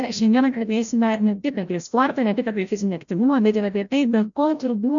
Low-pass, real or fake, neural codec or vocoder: 7.2 kHz; fake; codec, 16 kHz, 0.5 kbps, FreqCodec, larger model